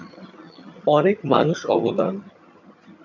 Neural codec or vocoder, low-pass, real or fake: vocoder, 22.05 kHz, 80 mel bands, HiFi-GAN; 7.2 kHz; fake